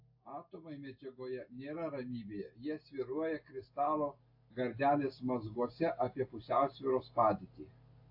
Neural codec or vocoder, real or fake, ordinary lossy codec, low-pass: none; real; AAC, 32 kbps; 5.4 kHz